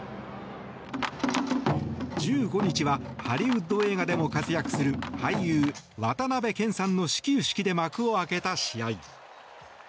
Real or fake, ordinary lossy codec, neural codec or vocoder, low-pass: real; none; none; none